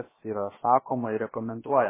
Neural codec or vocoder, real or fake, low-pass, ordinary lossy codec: codec, 16 kHz, about 1 kbps, DyCAST, with the encoder's durations; fake; 3.6 kHz; MP3, 16 kbps